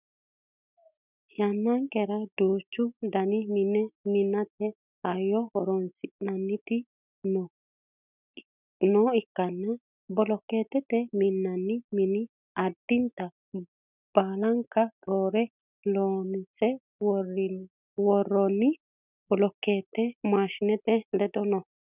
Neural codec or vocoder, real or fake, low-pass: none; real; 3.6 kHz